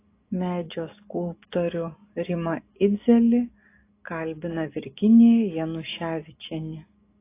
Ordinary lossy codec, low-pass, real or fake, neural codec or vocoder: AAC, 24 kbps; 3.6 kHz; real; none